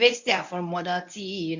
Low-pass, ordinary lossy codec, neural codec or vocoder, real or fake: 7.2 kHz; none; codec, 24 kHz, 0.9 kbps, WavTokenizer, medium speech release version 1; fake